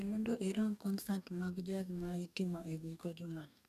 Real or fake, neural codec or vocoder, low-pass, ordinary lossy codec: fake; codec, 44.1 kHz, 2.6 kbps, DAC; 14.4 kHz; none